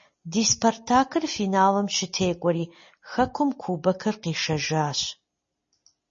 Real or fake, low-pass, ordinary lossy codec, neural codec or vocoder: real; 7.2 kHz; MP3, 32 kbps; none